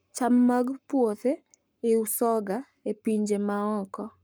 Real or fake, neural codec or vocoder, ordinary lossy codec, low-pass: fake; codec, 44.1 kHz, 7.8 kbps, Pupu-Codec; none; none